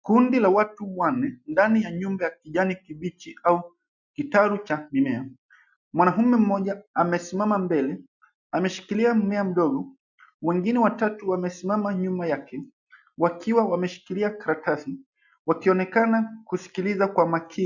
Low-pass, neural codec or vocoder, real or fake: 7.2 kHz; none; real